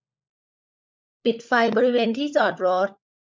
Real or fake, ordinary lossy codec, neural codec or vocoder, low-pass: fake; none; codec, 16 kHz, 16 kbps, FunCodec, trained on LibriTTS, 50 frames a second; none